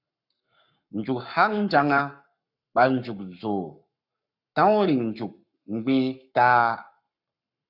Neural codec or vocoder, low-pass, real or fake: codec, 44.1 kHz, 7.8 kbps, Pupu-Codec; 5.4 kHz; fake